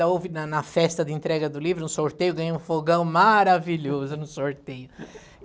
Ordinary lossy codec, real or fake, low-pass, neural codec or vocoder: none; real; none; none